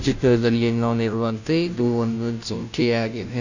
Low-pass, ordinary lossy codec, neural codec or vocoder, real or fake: 7.2 kHz; none; codec, 16 kHz, 0.5 kbps, FunCodec, trained on Chinese and English, 25 frames a second; fake